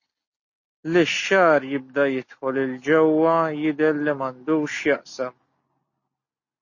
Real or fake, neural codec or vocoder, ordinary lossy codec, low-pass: real; none; MP3, 32 kbps; 7.2 kHz